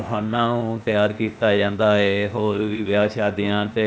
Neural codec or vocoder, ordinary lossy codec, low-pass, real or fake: codec, 16 kHz, 0.8 kbps, ZipCodec; none; none; fake